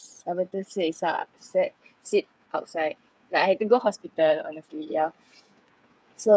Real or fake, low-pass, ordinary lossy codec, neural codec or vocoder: fake; none; none; codec, 16 kHz, 8 kbps, FreqCodec, smaller model